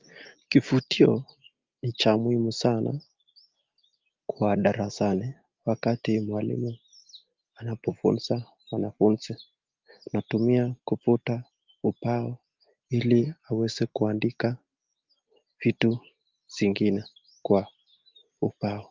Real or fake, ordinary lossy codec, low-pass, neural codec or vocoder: real; Opus, 32 kbps; 7.2 kHz; none